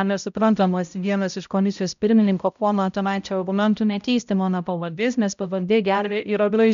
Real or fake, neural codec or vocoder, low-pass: fake; codec, 16 kHz, 0.5 kbps, X-Codec, HuBERT features, trained on balanced general audio; 7.2 kHz